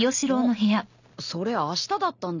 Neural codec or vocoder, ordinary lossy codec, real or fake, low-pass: none; none; real; 7.2 kHz